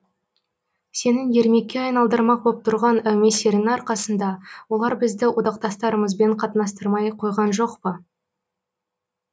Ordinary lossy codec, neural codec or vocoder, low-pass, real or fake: none; none; none; real